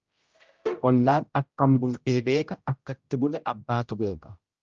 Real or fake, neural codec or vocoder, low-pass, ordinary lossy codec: fake; codec, 16 kHz, 0.5 kbps, X-Codec, HuBERT features, trained on general audio; 7.2 kHz; Opus, 24 kbps